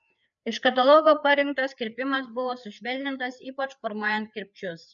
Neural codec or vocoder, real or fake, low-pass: codec, 16 kHz, 4 kbps, FreqCodec, larger model; fake; 7.2 kHz